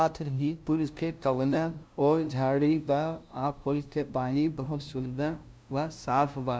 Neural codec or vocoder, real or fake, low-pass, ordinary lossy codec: codec, 16 kHz, 0.5 kbps, FunCodec, trained on LibriTTS, 25 frames a second; fake; none; none